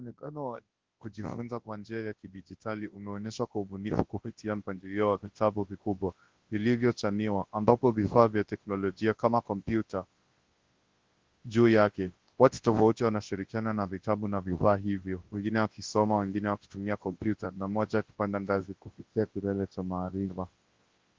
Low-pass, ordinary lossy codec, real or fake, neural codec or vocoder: 7.2 kHz; Opus, 16 kbps; fake; codec, 24 kHz, 0.9 kbps, WavTokenizer, large speech release